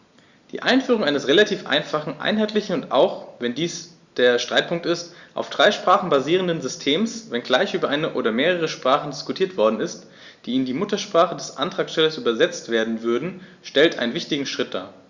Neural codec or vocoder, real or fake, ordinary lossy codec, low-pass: none; real; Opus, 64 kbps; 7.2 kHz